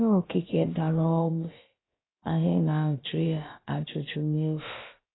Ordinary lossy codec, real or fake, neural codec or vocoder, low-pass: AAC, 16 kbps; fake; codec, 16 kHz, about 1 kbps, DyCAST, with the encoder's durations; 7.2 kHz